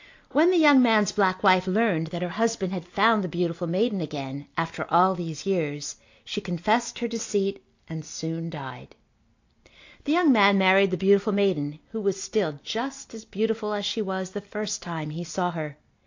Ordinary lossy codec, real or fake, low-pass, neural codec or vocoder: AAC, 48 kbps; real; 7.2 kHz; none